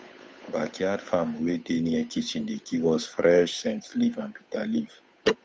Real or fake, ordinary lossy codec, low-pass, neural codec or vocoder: fake; none; none; codec, 16 kHz, 8 kbps, FunCodec, trained on Chinese and English, 25 frames a second